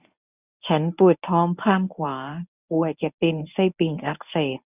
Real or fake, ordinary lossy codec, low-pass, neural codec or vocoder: fake; none; 3.6 kHz; codec, 24 kHz, 0.9 kbps, WavTokenizer, medium speech release version 1